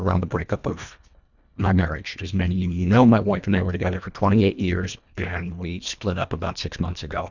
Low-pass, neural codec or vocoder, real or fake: 7.2 kHz; codec, 24 kHz, 1.5 kbps, HILCodec; fake